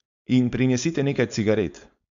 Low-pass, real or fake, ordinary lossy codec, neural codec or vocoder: 7.2 kHz; fake; MP3, 64 kbps; codec, 16 kHz, 4.8 kbps, FACodec